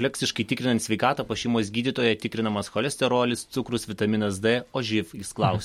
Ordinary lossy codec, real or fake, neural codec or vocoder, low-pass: MP3, 64 kbps; real; none; 19.8 kHz